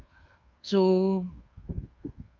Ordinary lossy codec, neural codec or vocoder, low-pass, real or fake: Opus, 24 kbps; codec, 24 kHz, 1.2 kbps, DualCodec; 7.2 kHz; fake